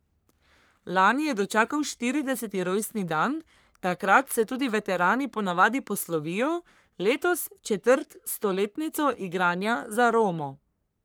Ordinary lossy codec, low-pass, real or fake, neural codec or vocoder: none; none; fake; codec, 44.1 kHz, 3.4 kbps, Pupu-Codec